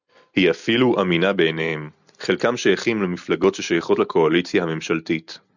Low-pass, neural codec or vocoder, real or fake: 7.2 kHz; none; real